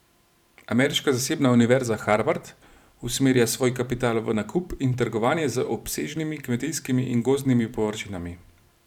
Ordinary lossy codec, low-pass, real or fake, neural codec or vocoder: none; 19.8 kHz; fake; vocoder, 44.1 kHz, 128 mel bands every 512 samples, BigVGAN v2